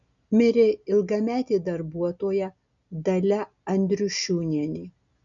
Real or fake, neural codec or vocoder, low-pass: real; none; 7.2 kHz